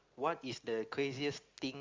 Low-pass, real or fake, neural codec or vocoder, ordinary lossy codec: 7.2 kHz; real; none; Opus, 32 kbps